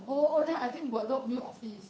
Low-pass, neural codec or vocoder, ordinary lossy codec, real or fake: none; codec, 16 kHz, 2 kbps, FunCodec, trained on Chinese and English, 25 frames a second; none; fake